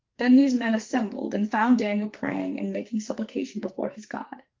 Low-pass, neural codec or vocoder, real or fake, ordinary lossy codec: 7.2 kHz; codec, 44.1 kHz, 2.6 kbps, SNAC; fake; Opus, 32 kbps